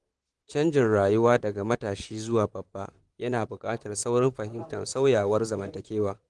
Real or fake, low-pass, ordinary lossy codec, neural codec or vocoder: fake; 10.8 kHz; Opus, 24 kbps; vocoder, 44.1 kHz, 128 mel bands, Pupu-Vocoder